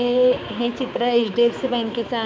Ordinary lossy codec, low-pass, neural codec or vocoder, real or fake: none; none; codec, 16 kHz, 4 kbps, X-Codec, HuBERT features, trained on balanced general audio; fake